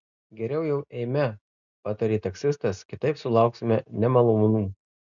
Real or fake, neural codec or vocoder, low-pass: real; none; 7.2 kHz